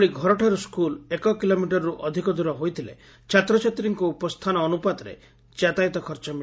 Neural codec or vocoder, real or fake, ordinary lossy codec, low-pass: none; real; none; none